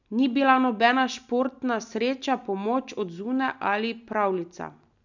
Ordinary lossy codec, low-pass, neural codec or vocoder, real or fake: none; 7.2 kHz; none; real